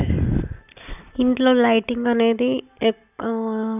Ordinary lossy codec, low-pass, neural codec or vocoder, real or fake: none; 3.6 kHz; none; real